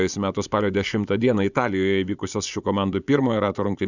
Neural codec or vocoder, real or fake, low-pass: vocoder, 24 kHz, 100 mel bands, Vocos; fake; 7.2 kHz